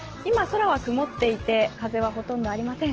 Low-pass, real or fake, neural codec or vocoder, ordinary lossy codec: 7.2 kHz; real; none; Opus, 16 kbps